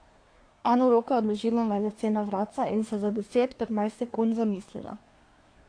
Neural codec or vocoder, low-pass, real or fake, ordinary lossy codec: codec, 24 kHz, 1 kbps, SNAC; 9.9 kHz; fake; AAC, 48 kbps